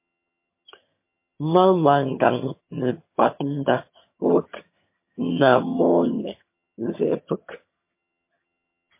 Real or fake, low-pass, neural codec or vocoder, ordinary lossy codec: fake; 3.6 kHz; vocoder, 22.05 kHz, 80 mel bands, HiFi-GAN; MP3, 24 kbps